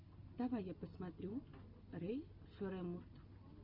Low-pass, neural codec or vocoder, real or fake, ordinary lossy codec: 5.4 kHz; none; real; Opus, 64 kbps